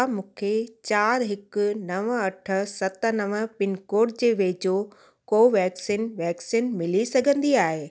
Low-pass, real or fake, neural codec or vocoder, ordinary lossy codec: none; real; none; none